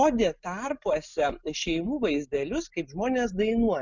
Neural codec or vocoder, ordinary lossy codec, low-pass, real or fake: none; Opus, 64 kbps; 7.2 kHz; real